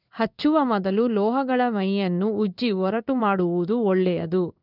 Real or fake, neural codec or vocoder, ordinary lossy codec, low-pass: fake; codec, 16 kHz in and 24 kHz out, 1 kbps, XY-Tokenizer; none; 5.4 kHz